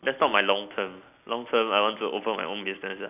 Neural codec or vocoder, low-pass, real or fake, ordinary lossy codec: none; 3.6 kHz; real; none